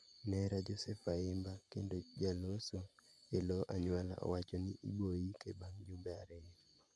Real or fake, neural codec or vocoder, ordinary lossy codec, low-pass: fake; vocoder, 24 kHz, 100 mel bands, Vocos; none; none